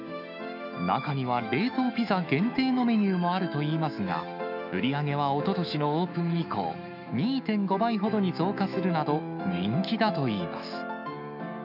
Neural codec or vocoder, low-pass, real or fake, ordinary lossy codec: autoencoder, 48 kHz, 128 numbers a frame, DAC-VAE, trained on Japanese speech; 5.4 kHz; fake; none